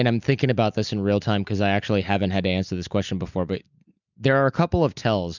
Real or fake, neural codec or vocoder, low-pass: real; none; 7.2 kHz